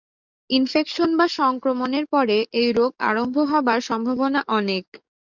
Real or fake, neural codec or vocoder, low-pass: fake; codec, 44.1 kHz, 7.8 kbps, DAC; 7.2 kHz